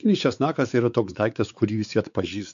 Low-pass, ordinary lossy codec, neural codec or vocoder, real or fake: 7.2 kHz; AAC, 96 kbps; codec, 16 kHz, 4 kbps, X-Codec, WavLM features, trained on Multilingual LibriSpeech; fake